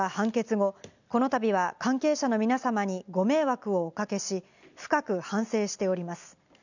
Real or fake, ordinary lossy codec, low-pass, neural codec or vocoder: real; none; 7.2 kHz; none